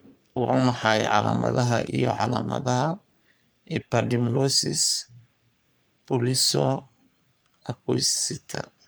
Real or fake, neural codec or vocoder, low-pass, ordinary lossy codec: fake; codec, 44.1 kHz, 3.4 kbps, Pupu-Codec; none; none